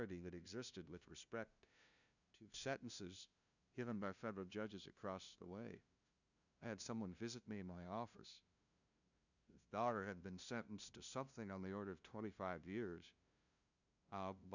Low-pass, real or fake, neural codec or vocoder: 7.2 kHz; fake; codec, 16 kHz, 0.5 kbps, FunCodec, trained on LibriTTS, 25 frames a second